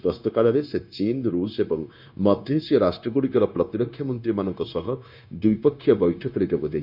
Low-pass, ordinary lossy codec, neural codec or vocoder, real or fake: 5.4 kHz; none; codec, 16 kHz, 0.9 kbps, LongCat-Audio-Codec; fake